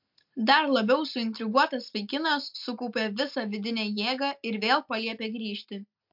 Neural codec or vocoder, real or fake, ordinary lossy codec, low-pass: none; real; MP3, 48 kbps; 5.4 kHz